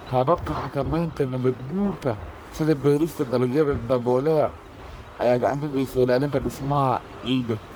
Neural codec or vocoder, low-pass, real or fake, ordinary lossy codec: codec, 44.1 kHz, 1.7 kbps, Pupu-Codec; none; fake; none